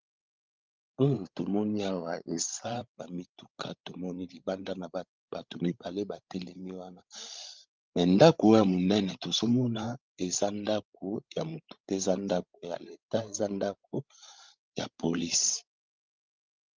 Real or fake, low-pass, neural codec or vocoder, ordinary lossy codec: fake; 7.2 kHz; codec, 16 kHz, 16 kbps, FreqCodec, larger model; Opus, 24 kbps